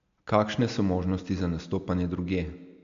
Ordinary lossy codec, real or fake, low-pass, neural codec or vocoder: AAC, 64 kbps; real; 7.2 kHz; none